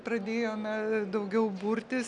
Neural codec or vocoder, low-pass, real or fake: none; 10.8 kHz; real